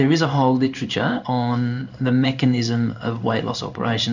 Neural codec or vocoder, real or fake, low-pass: codec, 16 kHz in and 24 kHz out, 1 kbps, XY-Tokenizer; fake; 7.2 kHz